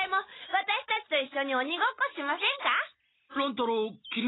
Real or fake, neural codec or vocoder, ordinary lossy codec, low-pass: real; none; AAC, 16 kbps; 7.2 kHz